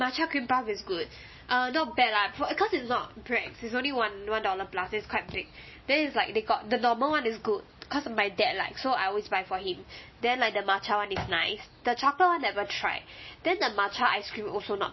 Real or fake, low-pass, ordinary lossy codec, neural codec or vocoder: real; 7.2 kHz; MP3, 24 kbps; none